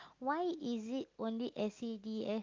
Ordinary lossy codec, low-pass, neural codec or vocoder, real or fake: Opus, 24 kbps; 7.2 kHz; none; real